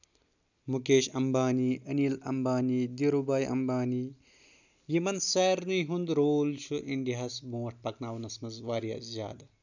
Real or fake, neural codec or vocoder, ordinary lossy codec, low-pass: real; none; none; 7.2 kHz